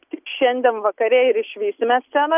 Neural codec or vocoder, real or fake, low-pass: none; real; 3.6 kHz